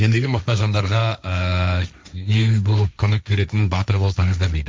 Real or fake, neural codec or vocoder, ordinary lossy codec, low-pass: fake; codec, 16 kHz, 1.1 kbps, Voila-Tokenizer; none; none